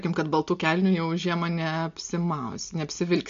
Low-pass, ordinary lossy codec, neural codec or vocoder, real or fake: 7.2 kHz; MP3, 48 kbps; none; real